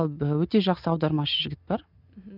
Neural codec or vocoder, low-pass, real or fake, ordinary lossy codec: none; 5.4 kHz; real; none